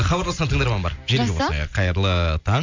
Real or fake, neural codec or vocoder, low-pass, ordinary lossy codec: real; none; 7.2 kHz; MP3, 64 kbps